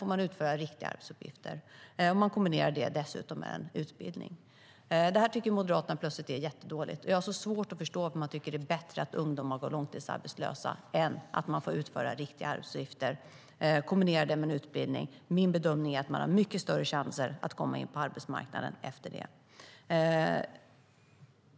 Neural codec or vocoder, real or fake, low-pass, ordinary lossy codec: none; real; none; none